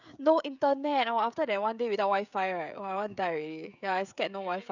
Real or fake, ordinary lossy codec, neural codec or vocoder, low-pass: fake; none; codec, 16 kHz, 16 kbps, FreqCodec, smaller model; 7.2 kHz